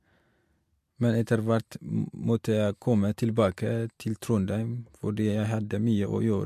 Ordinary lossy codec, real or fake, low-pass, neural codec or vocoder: MP3, 64 kbps; real; 14.4 kHz; none